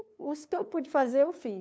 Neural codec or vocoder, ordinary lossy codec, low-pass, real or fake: codec, 16 kHz, 2 kbps, FunCodec, trained on LibriTTS, 25 frames a second; none; none; fake